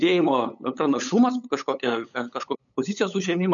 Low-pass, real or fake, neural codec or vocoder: 7.2 kHz; fake; codec, 16 kHz, 8 kbps, FunCodec, trained on LibriTTS, 25 frames a second